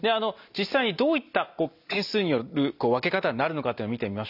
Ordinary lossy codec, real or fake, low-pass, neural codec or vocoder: none; real; 5.4 kHz; none